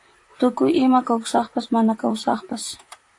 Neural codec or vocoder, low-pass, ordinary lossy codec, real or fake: vocoder, 44.1 kHz, 128 mel bands, Pupu-Vocoder; 10.8 kHz; AAC, 48 kbps; fake